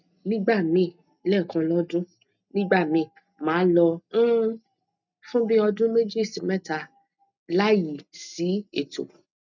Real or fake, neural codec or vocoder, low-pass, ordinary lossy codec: real; none; 7.2 kHz; none